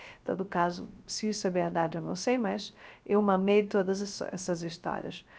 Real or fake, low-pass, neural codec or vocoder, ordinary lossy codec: fake; none; codec, 16 kHz, 0.3 kbps, FocalCodec; none